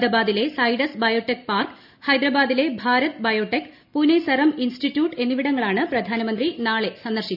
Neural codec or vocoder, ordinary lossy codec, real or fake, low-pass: none; none; real; 5.4 kHz